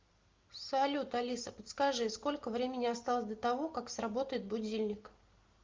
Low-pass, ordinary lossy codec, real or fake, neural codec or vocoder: 7.2 kHz; Opus, 32 kbps; real; none